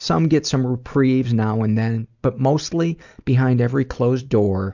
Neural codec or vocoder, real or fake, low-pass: none; real; 7.2 kHz